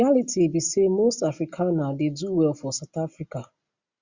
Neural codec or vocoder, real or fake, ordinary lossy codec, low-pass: none; real; Opus, 64 kbps; 7.2 kHz